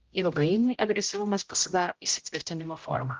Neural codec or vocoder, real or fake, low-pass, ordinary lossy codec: codec, 16 kHz, 0.5 kbps, X-Codec, HuBERT features, trained on general audio; fake; 7.2 kHz; Opus, 32 kbps